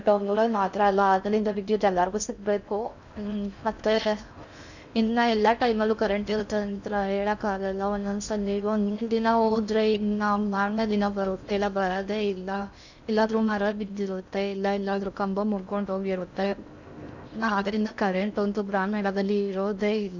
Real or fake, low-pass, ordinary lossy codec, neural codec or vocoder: fake; 7.2 kHz; none; codec, 16 kHz in and 24 kHz out, 0.6 kbps, FocalCodec, streaming, 4096 codes